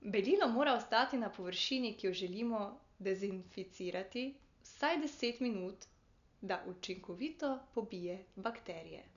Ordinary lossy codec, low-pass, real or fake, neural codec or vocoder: none; 7.2 kHz; real; none